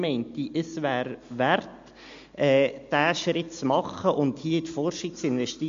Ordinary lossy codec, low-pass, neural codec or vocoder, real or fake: MP3, 48 kbps; 7.2 kHz; none; real